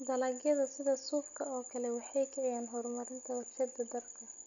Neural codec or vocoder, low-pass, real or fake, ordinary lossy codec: none; 7.2 kHz; real; none